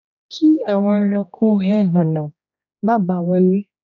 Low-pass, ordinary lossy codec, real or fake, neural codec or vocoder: 7.2 kHz; none; fake; codec, 16 kHz, 1 kbps, X-Codec, HuBERT features, trained on general audio